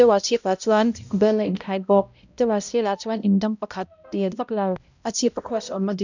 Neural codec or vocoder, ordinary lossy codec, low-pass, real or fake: codec, 16 kHz, 0.5 kbps, X-Codec, HuBERT features, trained on balanced general audio; none; 7.2 kHz; fake